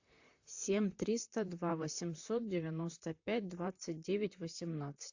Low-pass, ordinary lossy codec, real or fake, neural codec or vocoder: 7.2 kHz; AAC, 48 kbps; fake; vocoder, 44.1 kHz, 128 mel bands, Pupu-Vocoder